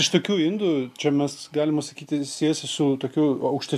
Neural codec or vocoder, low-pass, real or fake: none; 14.4 kHz; real